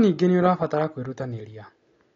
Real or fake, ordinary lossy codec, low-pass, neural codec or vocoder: real; AAC, 32 kbps; 7.2 kHz; none